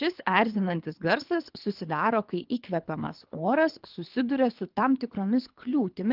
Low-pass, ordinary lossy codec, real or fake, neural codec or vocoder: 5.4 kHz; Opus, 32 kbps; fake; codec, 24 kHz, 3 kbps, HILCodec